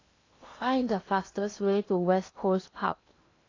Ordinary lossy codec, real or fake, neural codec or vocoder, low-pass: AAC, 32 kbps; fake; codec, 16 kHz in and 24 kHz out, 0.8 kbps, FocalCodec, streaming, 65536 codes; 7.2 kHz